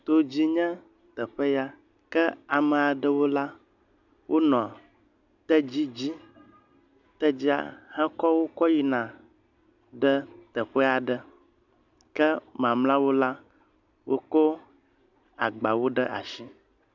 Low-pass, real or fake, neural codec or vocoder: 7.2 kHz; real; none